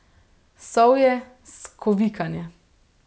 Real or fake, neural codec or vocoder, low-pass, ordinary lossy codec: real; none; none; none